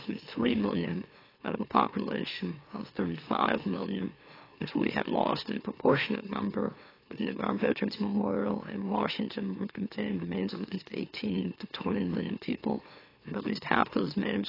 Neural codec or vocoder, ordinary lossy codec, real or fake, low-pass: autoencoder, 44.1 kHz, a latent of 192 numbers a frame, MeloTTS; AAC, 24 kbps; fake; 5.4 kHz